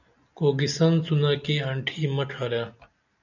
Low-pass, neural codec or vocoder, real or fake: 7.2 kHz; none; real